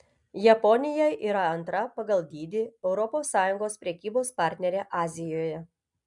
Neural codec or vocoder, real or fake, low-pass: none; real; 10.8 kHz